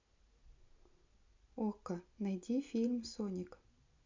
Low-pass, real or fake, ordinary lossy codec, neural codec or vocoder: 7.2 kHz; real; MP3, 64 kbps; none